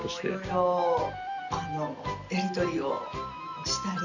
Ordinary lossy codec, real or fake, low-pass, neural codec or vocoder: Opus, 64 kbps; real; 7.2 kHz; none